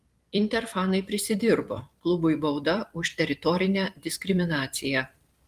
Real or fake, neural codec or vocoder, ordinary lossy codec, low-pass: fake; vocoder, 44.1 kHz, 128 mel bands every 256 samples, BigVGAN v2; Opus, 24 kbps; 14.4 kHz